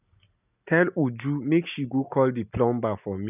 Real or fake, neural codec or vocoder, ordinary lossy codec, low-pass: real; none; none; 3.6 kHz